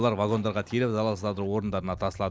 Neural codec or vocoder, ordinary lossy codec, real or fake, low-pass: none; none; real; none